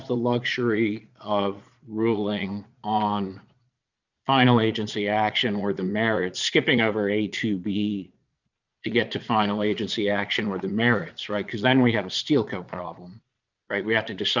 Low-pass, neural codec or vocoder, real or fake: 7.2 kHz; vocoder, 22.05 kHz, 80 mel bands, Vocos; fake